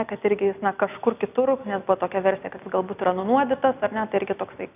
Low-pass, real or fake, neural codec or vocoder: 3.6 kHz; real; none